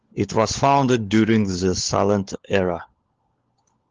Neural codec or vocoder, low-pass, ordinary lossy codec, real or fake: codec, 16 kHz, 16 kbps, FunCodec, trained on LibriTTS, 50 frames a second; 7.2 kHz; Opus, 16 kbps; fake